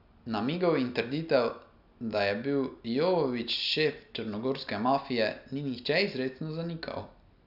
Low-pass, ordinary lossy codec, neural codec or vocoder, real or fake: 5.4 kHz; none; none; real